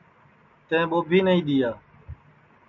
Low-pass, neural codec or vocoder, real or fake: 7.2 kHz; none; real